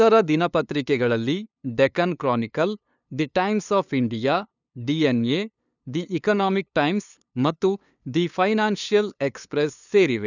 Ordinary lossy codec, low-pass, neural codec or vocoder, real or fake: none; 7.2 kHz; codec, 16 kHz, 2 kbps, FunCodec, trained on LibriTTS, 25 frames a second; fake